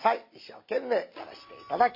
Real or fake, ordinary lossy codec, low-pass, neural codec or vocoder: real; MP3, 32 kbps; 5.4 kHz; none